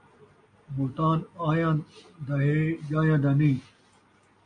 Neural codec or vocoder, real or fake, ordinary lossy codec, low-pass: none; real; MP3, 48 kbps; 9.9 kHz